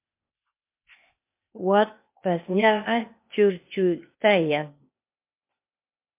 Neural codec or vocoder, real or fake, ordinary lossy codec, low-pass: codec, 16 kHz, 0.8 kbps, ZipCodec; fake; MP3, 32 kbps; 3.6 kHz